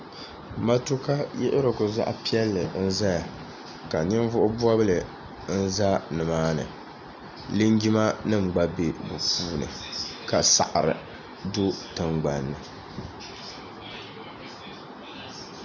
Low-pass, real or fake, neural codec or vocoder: 7.2 kHz; real; none